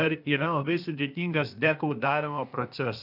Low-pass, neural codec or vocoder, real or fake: 5.4 kHz; codec, 16 kHz, 0.8 kbps, ZipCodec; fake